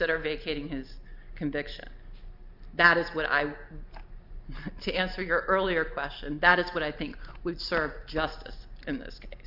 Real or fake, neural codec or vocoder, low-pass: real; none; 5.4 kHz